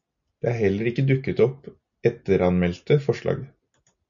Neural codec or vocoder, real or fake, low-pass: none; real; 7.2 kHz